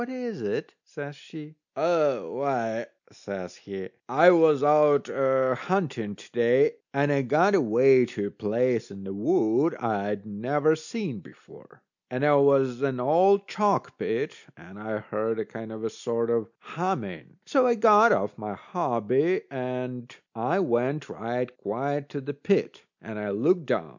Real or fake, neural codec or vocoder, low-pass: real; none; 7.2 kHz